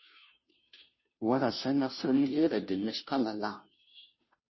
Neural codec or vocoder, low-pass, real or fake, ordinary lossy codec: codec, 16 kHz, 0.5 kbps, FunCodec, trained on Chinese and English, 25 frames a second; 7.2 kHz; fake; MP3, 24 kbps